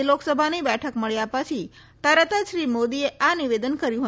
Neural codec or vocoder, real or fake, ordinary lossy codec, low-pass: none; real; none; none